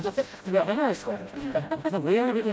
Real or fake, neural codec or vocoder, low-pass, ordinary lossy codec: fake; codec, 16 kHz, 0.5 kbps, FreqCodec, smaller model; none; none